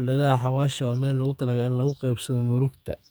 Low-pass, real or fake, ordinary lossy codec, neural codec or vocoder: none; fake; none; codec, 44.1 kHz, 2.6 kbps, SNAC